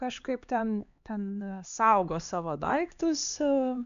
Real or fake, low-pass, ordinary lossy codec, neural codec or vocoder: fake; 7.2 kHz; MP3, 64 kbps; codec, 16 kHz, 2 kbps, X-Codec, HuBERT features, trained on LibriSpeech